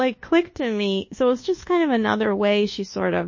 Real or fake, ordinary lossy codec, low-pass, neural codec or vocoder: fake; MP3, 32 kbps; 7.2 kHz; codec, 24 kHz, 1.2 kbps, DualCodec